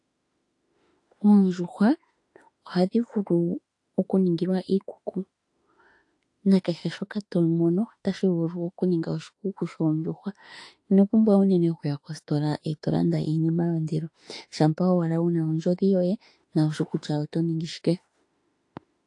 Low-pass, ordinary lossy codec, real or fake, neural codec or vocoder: 10.8 kHz; AAC, 48 kbps; fake; autoencoder, 48 kHz, 32 numbers a frame, DAC-VAE, trained on Japanese speech